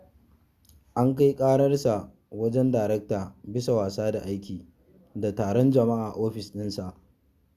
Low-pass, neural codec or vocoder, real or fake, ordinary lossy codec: 14.4 kHz; none; real; Opus, 64 kbps